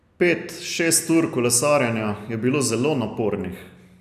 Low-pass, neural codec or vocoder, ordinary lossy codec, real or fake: 14.4 kHz; none; none; real